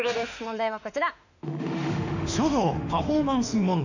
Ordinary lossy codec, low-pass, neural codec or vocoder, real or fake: none; 7.2 kHz; autoencoder, 48 kHz, 32 numbers a frame, DAC-VAE, trained on Japanese speech; fake